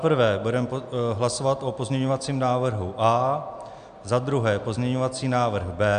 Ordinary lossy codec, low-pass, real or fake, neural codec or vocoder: AAC, 96 kbps; 9.9 kHz; real; none